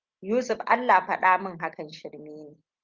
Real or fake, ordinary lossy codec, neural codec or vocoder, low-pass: real; Opus, 24 kbps; none; 7.2 kHz